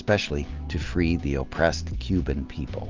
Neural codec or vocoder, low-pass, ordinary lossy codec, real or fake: none; 7.2 kHz; Opus, 32 kbps; real